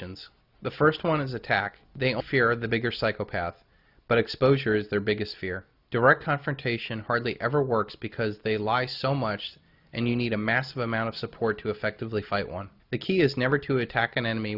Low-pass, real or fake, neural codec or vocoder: 5.4 kHz; real; none